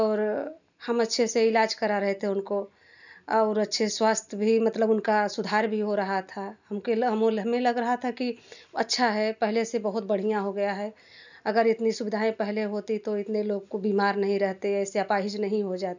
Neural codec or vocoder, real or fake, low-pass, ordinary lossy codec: none; real; 7.2 kHz; none